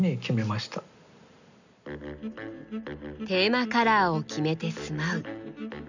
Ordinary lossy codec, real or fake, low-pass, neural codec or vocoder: none; real; 7.2 kHz; none